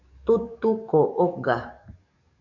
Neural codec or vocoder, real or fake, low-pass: codec, 44.1 kHz, 7.8 kbps, DAC; fake; 7.2 kHz